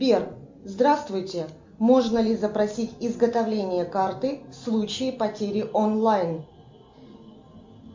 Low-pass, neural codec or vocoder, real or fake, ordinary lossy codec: 7.2 kHz; none; real; MP3, 64 kbps